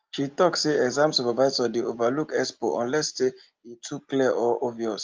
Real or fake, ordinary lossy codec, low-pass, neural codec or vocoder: real; Opus, 32 kbps; 7.2 kHz; none